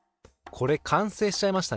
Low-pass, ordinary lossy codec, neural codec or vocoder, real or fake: none; none; none; real